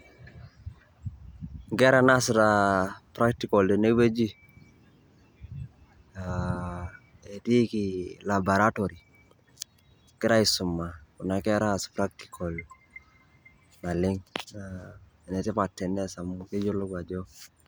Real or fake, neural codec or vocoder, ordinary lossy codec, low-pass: real; none; none; none